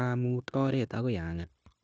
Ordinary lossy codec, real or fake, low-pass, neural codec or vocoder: none; fake; none; codec, 16 kHz, 0.9 kbps, LongCat-Audio-Codec